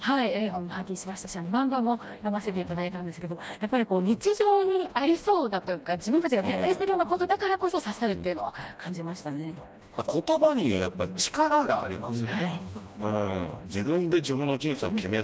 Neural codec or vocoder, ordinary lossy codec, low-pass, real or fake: codec, 16 kHz, 1 kbps, FreqCodec, smaller model; none; none; fake